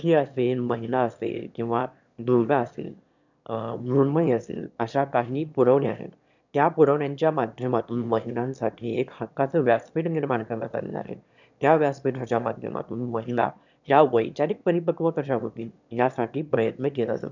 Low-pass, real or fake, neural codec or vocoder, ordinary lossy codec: 7.2 kHz; fake; autoencoder, 22.05 kHz, a latent of 192 numbers a frame, VITS, trained on one speaker; none